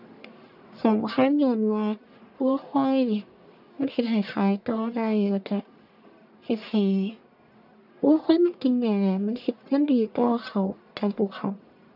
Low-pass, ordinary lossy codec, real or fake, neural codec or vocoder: 5.4 kHz; none; fake; codec, 44.1 kHz, 1.7 kbps, Pupu-Codec